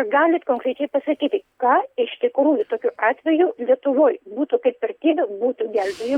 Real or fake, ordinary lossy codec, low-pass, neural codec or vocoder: real; Opus, 64 kbps; 19.8 kHz; none